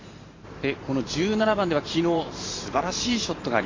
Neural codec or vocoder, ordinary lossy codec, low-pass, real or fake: vocoder, 44.1 kHz, 128 mel bands every 512 samples, BigVGAN v2; AAC, 32 kbps; 7.2 kHz; fake